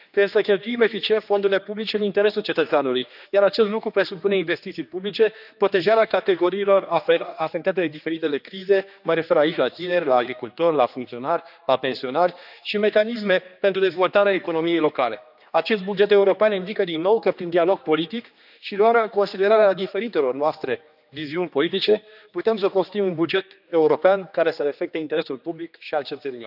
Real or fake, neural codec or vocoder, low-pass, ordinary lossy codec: fake; codec, 16 kHz, 2 kbps, X-Codec, HuBERT features, trained on general audio; 5.4 kHz; none